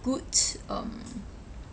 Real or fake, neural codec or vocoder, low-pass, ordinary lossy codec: real; none; none; none